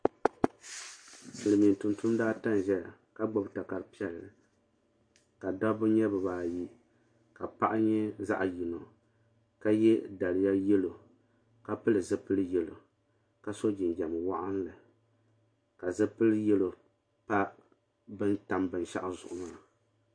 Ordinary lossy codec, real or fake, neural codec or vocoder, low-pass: MP3, 48 kbps; real; none; 9.9 kHz